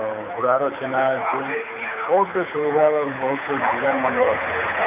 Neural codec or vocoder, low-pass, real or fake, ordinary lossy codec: codec, 24 kHz, 6 kbps, HILCodec; 3.6 kHz; fake; MP3, 32 kbps